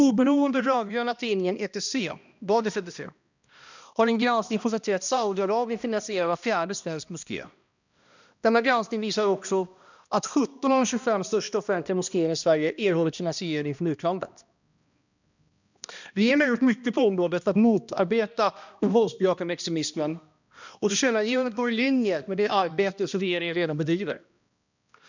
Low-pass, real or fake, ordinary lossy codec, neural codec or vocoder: 7.2 kHz; fake; none; codec, 16 kHz, 1 kbps, X-Codec, HuBERT features, trained on balanced general audio